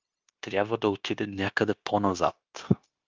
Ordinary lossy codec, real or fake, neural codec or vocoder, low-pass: Opus, 24 kbps; fake; codec, 16 kHz, 0.9 kbps, LongCat-Audio-Codec; 7.2 kHz